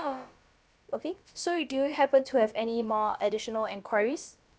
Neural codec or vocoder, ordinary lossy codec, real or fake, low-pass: codec, 16 kHz, about 1 kbps, DyCAST, with the encoder's durations; none; fake; none